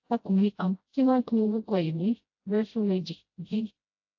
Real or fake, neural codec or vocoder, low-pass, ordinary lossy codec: fake; codec, 16 kHz, 0.5 kbps, FreqCodec, smaller model; 7.2 kHz; AAC, 48 kbps